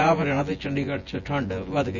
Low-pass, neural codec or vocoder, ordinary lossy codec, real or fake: 7.2 kHz; vocoder, 24 kHz, 100 mel bands, Vocos; none; fake